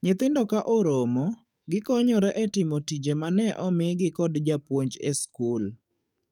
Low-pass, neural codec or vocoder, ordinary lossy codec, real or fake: 19.8 kHz; codec, 44.1 kHz, 7.8 kbps, DAC; none; fake